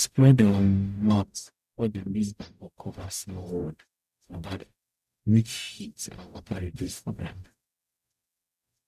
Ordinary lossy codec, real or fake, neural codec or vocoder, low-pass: none; fake; codec, 44.1 kHz, 0.9 kbps, DAC; 14.4 kHz